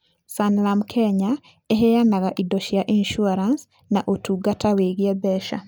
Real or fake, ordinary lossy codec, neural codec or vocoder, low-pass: real; none; none; none